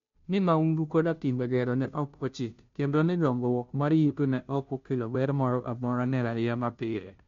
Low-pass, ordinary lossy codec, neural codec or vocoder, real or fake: 7.2 kHz; MP3, 48 kbps; codec, 16 kHz, 0.5 kbps, FunCodec, trained on Chinese and English, 25 frames a second; fake